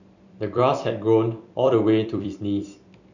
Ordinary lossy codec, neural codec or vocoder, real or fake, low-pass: none; vocoder, 44.1 kHz, 128 mel bands every 256 samples, BigVGAN v2; fake; 7.2 kHz